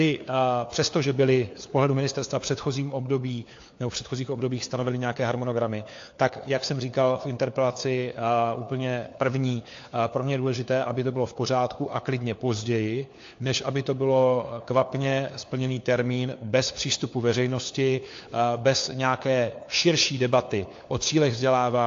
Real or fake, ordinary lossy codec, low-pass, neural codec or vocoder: fake; AAC, 48 kbps; 7.2 kHz; codec, 16 kHz, 4 kbps, FunCodec, trained on LibriTTS, 50 frames a second